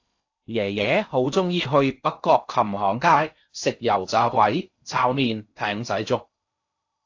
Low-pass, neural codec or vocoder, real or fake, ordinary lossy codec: 7.2 kHz; codec, 16 kHz in and 24 kHz out, 0.6 kbps, FocalCodec, streaming, 4096 codes; fake; AAC, 48 kbps